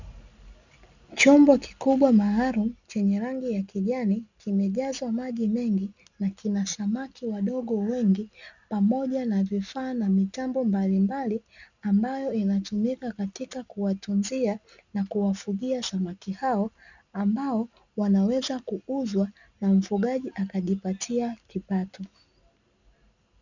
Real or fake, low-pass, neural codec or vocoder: real; 7.2 kHz; none